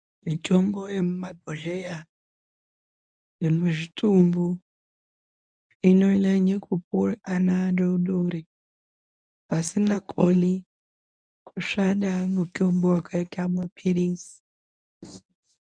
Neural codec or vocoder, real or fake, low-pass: codec, 24 kHz, 0.9 kbps, WavTokenizer, medium speech release version 1; fake; 9.9 kHz